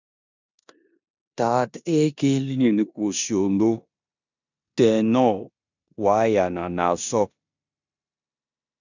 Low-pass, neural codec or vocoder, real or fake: 7.2 kHz; codec, 16 kHz in and 24 kHz out, 0.9 kbps, LongCat-Audio-Codec, four codebook decoder; fake